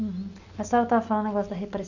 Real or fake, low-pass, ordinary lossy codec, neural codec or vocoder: real; 7.2 kHz; none; none